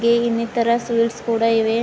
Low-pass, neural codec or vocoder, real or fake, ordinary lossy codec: none; none; real; none